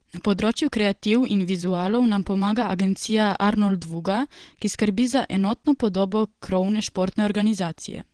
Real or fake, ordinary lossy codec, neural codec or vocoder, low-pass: fake; Opus, 16 kbps; vocoder, 22.05 kHz, 80 mel bands, WaveNeXt; 9.9 kHz